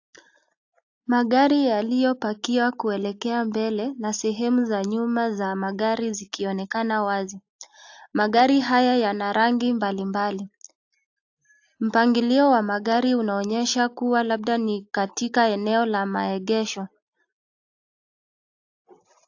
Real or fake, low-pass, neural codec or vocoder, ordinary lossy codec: real; 7.2 kHz; none; AAC, 48 kbps